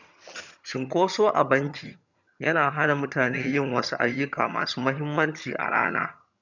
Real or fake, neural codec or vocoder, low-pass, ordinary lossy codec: fake; vocoder, 22.05 kHz, 80 mel bands, HiFi-GAN; 7.2 kHz; none